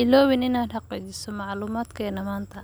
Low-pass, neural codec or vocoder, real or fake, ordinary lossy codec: none; none; real; none